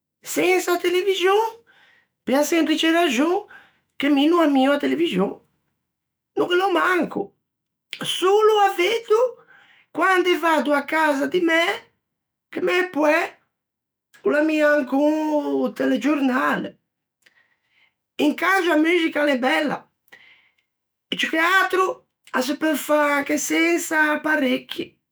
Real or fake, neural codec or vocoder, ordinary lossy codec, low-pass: fake; autoencoder, 48 kHz, 128 numbers a frame, DAC-VAE, trained on Japanese speech; none; none